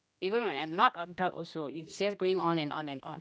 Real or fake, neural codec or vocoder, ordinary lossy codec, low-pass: fake; codec, 16 kHz, 1 kbps, X-Codec, HuBERT features, trained on general audio; none; none